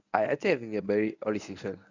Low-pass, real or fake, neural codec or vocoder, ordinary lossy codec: 7.2 kHz; fake; codec, 24 kHz, 0.9 kbps, WavTokenizer, medium speech release version 1; none